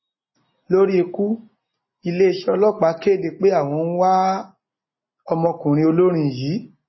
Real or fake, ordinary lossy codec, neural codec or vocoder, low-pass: real; MP3, 24 kbps; none; 7.2 kHz